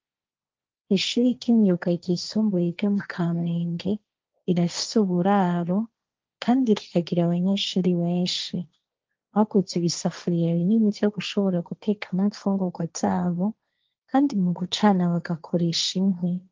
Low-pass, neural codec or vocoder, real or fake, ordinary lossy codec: 7.2 kHz; codec, 16 kHz, 1.1 kbps, Voila-Tokenizer; fake; Opus, 32 kbps